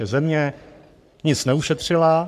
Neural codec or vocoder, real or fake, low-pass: codec, 44.1 kHz, 3.4 kbps, Pupu-Codec; fake; 14.4 kHz